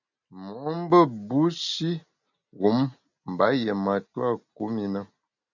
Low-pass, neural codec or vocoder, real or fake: 7.2 kHz; none; real